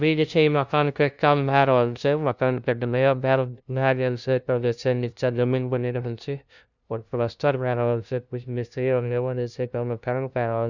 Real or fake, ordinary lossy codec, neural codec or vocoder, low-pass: fake; none; codec, 16 kHz, 0.5 kbps, FunCodec, trained on LibriTTS, 25 frames a second; 7.2 kHz